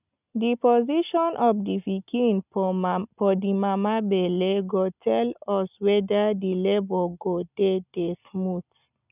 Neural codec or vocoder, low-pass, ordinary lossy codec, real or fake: none; 3.6 kHz; none; real